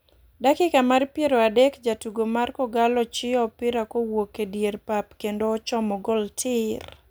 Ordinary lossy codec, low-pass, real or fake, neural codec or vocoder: none; none; real; none